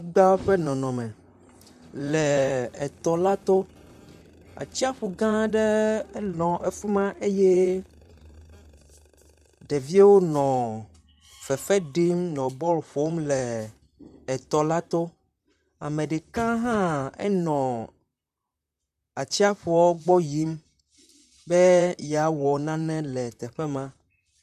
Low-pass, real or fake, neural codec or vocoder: 14.4 kHz; fake; vocoder, 44.1 kHz, 128 mel bands, Pupu-Vocoder